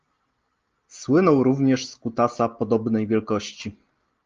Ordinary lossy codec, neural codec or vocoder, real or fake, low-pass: Opus, 32 kbps; none; real; 7.2 kHz